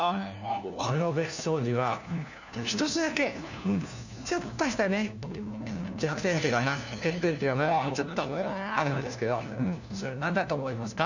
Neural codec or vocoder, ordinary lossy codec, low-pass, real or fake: codec, 16 kHz, 1 kbps, FunCodec, trained on LibriTTS, 50 frames a second; none; 7.2 kHz; fake